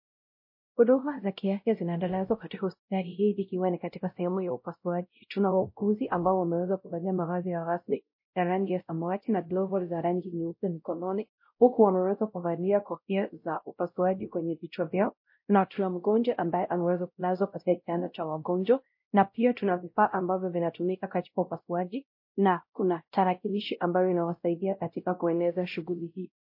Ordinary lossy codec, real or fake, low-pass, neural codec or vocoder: MP3, 32 kbps; fake; 5.4 kHz; codec, 16 kHz, 0.5 kbps, X-Codec, WavLM features, trained on Multilingual LibriSpeech